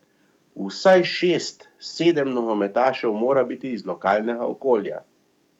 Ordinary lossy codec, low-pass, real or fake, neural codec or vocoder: none; 19.8 kHz; fake; codec, 44.1 kHz, 7.8 kbps, DAC